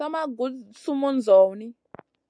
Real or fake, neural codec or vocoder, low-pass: real; none; 9.9 kHz